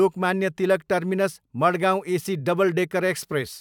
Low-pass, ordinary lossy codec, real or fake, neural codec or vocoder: 19.8 kHz; none; fake; vocoder, 44.1 kHz, 128 mel bands every 512 samples, BigVGAN v2